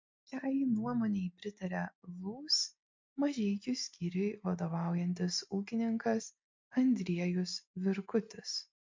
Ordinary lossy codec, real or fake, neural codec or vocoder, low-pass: MP3, 48 kbps; real; none; 7.2 kHz